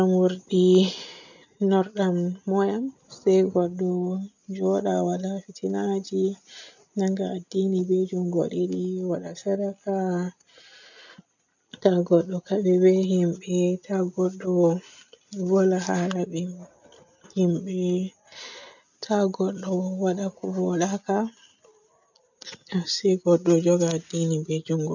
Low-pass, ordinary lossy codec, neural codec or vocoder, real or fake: 7.2 kHz; none; none; real